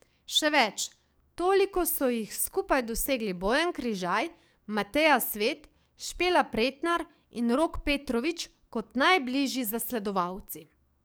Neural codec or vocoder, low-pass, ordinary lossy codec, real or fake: codec, 44.1 kHz, 7.8 kbps, DAC; none; none; fake